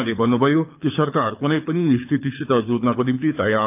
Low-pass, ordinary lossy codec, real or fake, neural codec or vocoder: 3.6 kHz; AAC, 32 kbps; fake; codec, 24 kHz, 6 kbps, HILCodec